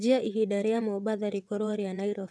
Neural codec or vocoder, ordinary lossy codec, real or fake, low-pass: vocoder, 22.05 kHz, 80 mel bands, WaveNeXt; none; fake; none